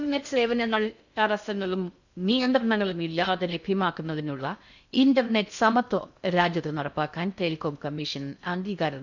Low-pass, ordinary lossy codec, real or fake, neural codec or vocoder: 7.2 kHz; none; fake; codec, 16 kHz in and 24 kHz out, 0.6 kbps, FocalCodec, streaming, 2048 codes